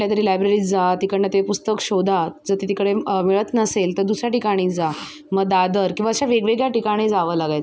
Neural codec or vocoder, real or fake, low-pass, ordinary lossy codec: none; real; none; none